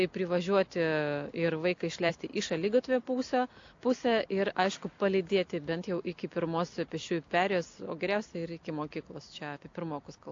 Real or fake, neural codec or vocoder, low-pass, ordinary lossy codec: real; none; 7.2 kHz; AAC, 48 kbps